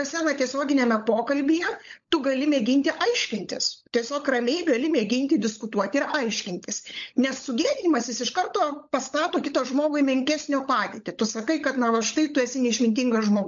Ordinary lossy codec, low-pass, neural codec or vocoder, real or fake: MP3, 48 kbps; 7.2 kHz; codec, 16 kHz, 16 kbps, FunCodec, trained on LibriTTS, 50 frames a second; fake